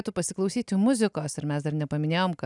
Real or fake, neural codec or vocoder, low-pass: real; none; 10.8 kHz